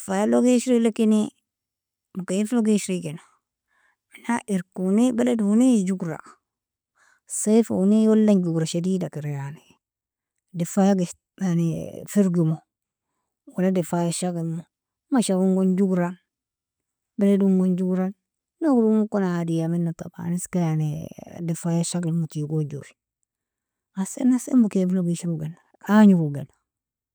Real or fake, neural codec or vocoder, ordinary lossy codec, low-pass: real; none; none; none